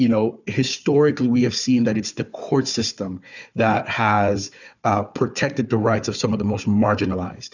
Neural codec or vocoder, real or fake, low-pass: codec, 16 kHz, 4 kbps, FunCodec, trained on Chinese and English, 50 frames a second; fake; 7.2 kHz